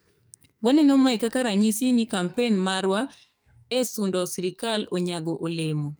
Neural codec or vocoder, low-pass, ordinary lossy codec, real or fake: codec, 44.1 kHz, 2.6 kbps, SNAC; none; none; fake